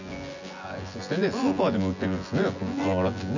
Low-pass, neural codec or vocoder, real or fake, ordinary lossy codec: 7.2 kHz; vocoder, 24 kHz, 100 mel bands, Vocos; fake; none